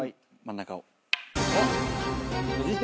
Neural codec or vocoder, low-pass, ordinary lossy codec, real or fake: none; none; none; real